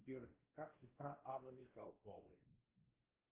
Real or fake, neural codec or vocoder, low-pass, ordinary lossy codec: fake; codec, 16 kHz, 1 kbps, X-Codec, WavLM features, trained on Multilingual LibriSpeech; 3.6 kHz; Opus, 16 kbps